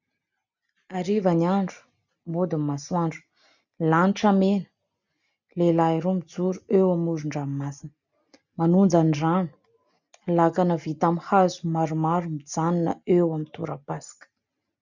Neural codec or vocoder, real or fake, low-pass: none; real; 7.2 kHz